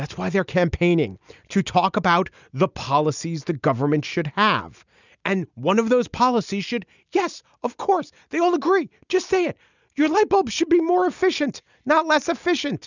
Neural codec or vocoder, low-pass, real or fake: none; 7.2 kHz; real